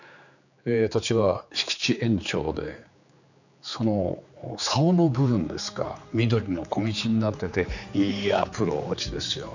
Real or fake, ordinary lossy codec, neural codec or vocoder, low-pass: fake; none; codec, 16 kHz, 4 kbps, X-Codec, HuBERT features, trained on general audio; 7.2 kHz